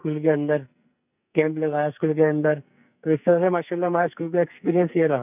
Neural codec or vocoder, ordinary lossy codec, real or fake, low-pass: codec, 44.1 kHz, 2.6 kbps, SNAC; none; fake; 3.6 kHz